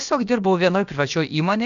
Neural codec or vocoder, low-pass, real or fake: codec, 16 kHz, about 1 kbps, DyCAST, with the encoder's durations; 7.2 kHz; fake